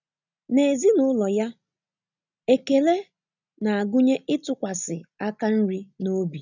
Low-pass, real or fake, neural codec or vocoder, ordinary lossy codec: 7.2 kHz; real; none; none